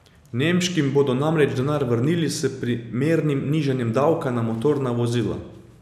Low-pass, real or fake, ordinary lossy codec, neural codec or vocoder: 14.4 kHz; real; none; none